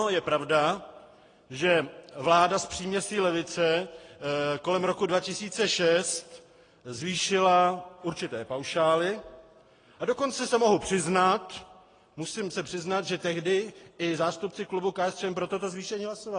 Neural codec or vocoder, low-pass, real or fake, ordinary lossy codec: none; 9.9 kHz; real; AAC, 32 kbps